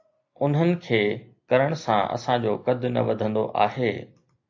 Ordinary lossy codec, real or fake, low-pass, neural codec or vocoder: AAC, 48 kbps; real; 7.2 kHz; none